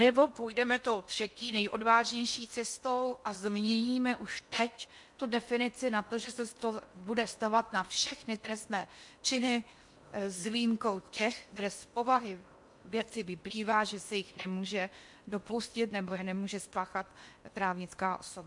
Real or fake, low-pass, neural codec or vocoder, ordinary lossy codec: fake; 10.8 kHz; codec, 16 kHz in and 24 kHz out, 0.8 kbps, FocalCodec, streaming, 65536 codes; MP3, 64 kbps